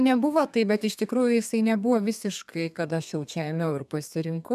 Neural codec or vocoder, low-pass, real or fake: codec, 32 kHz, 1.9 kbps, SNAC; 14.4 kHz; fake